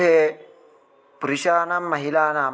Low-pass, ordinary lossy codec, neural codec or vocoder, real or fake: none; none; none; real